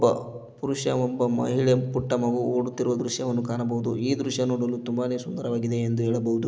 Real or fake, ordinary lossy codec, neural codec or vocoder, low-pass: real; none; none; none